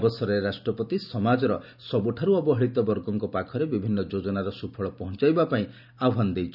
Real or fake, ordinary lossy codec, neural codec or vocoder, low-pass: real; none; none; 5.4 kHz